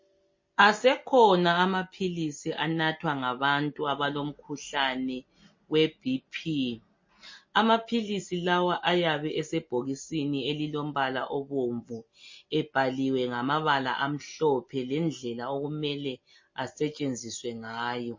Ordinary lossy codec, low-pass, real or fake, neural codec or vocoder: MP3, 32 kbps; 7.2 kHz; real; none